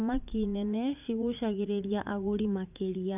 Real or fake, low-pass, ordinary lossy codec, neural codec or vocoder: fake; 3.6 kHz; none; vocoder, 44.1 kHz, 80 mel bands, Vocos